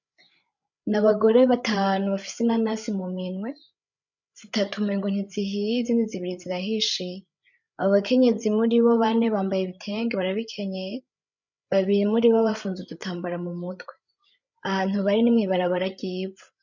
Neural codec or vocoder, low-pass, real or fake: codec, 16 kHz, 8 kbps, FreqCodec, larger model; 7.2 kHz; fake